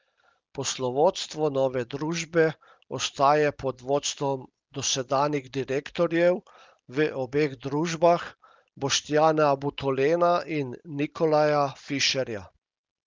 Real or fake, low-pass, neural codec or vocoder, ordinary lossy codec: real; 7.2 kHz; none; Opus, 32 kbps